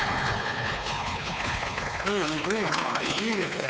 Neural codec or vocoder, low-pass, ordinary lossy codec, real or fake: codec, 16 kHz, 4 kbps, X-Codec, WavLM features, trained on Multilingual LibriSpeech; none; none; fake